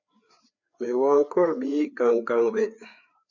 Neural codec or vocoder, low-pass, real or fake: codec, 16 kHz, 4 kbps, FreqCodec, larger model; 7.2 kHz; fake